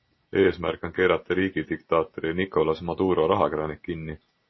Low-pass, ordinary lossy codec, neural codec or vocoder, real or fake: 7.2 kHz; MP3, 24 kbps; none; real